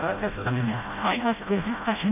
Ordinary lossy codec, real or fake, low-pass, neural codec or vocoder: none; fake; 3.6 kHz; codec, 16 kHz, 0.5 kbps, FreqCodec, smaller model